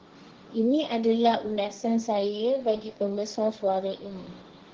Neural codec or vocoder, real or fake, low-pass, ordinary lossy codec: codec, 16 kHz, 1.1 kbps, Voila-Tokenizer; fake; 7.2 kHz; Opus, 16 kbps